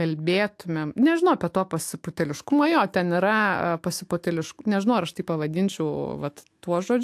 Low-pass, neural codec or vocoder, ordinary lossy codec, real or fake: 14.4 kHz; autoencoder, 48 kHz, 128 numbers a frame, DAC-VAE, trained on Japanese speech; AAC, 64 kbps; fake